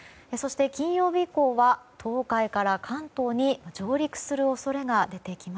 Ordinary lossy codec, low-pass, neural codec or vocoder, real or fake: none; none; none; real